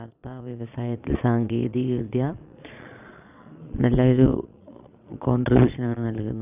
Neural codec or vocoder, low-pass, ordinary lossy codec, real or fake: vocoder, 22.05 kHz, 80 mel bands, Vocos; 3.6 kHz; none; fake